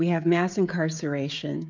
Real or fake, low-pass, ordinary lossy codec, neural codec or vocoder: fake; 7.2 kHz; MP3, 64 kbps; codec, 16 kHz, 16 kbps, FreqCodec, smaller model